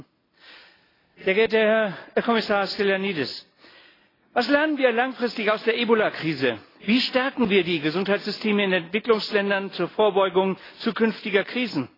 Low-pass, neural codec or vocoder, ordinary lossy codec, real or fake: 5.4 kHz; none; AAC, 24 kbps; real